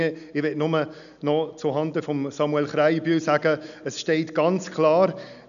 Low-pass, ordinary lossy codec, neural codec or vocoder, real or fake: 7.2 kHz; none; none; real